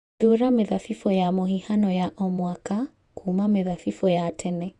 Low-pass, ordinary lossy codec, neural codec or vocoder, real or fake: 10.8 kHz; Opus, 64 kbps; vocoder, 48 kHz, 128 mel bands, Vocos; fake